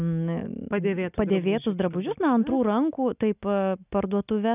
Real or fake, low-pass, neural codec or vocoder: real; 3.6 kHz; none